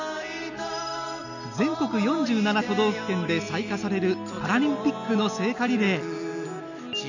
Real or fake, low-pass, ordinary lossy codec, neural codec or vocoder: real; 7.2 kHz; none; none